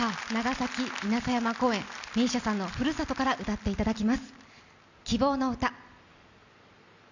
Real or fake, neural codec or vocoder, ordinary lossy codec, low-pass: real; none; none; 7.2 kHz